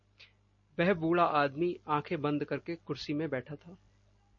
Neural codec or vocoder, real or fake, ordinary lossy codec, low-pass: none; real; MP3, 32 kbps; 7.2 kHz